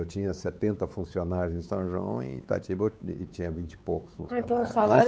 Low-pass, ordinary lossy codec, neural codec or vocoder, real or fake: none; none; codec, 16 kHz, 4 kbps, X-Codec, WavLM features, trained on Multilingual LibriSpeech; fake